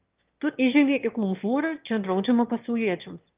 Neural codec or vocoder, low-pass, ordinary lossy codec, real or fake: autoencoder, 22.05 kHz, a latent of 192 numbers a frame, VITS, trained on one speaker; 3.6 kHz; Opus, 32 kbps; fake